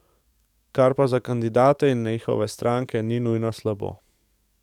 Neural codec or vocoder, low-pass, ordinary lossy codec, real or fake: codec, 44.1 kHz, 7.8 kbps, DAC; 19.8 kHz; none; fake